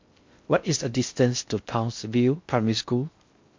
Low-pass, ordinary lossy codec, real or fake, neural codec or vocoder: 7.2 kHz; MP3, 48 kbps; fake; codec, 16 kHz in and 24 kHz out, 0.6 kbps, FocalCodec, streaming, 4096 codes